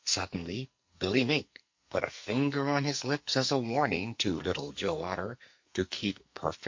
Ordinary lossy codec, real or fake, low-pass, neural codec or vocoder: MP3, 48 kbps; fake; 7.2 kHz; codec, 44.1 kHz, 2.6 kbps, SNAC